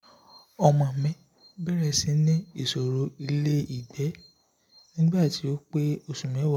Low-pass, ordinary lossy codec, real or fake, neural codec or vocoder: 19.8 kHz; MP3, 96 kbps; real; none